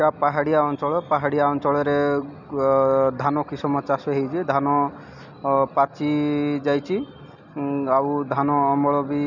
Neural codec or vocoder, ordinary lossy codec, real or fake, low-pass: none; none; real; 7.2 kHz